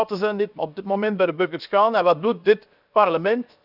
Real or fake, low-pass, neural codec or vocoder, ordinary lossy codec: fake; 5.4 kHz; codec, 16 kHz, 0.7 kbps, FocalCodec; none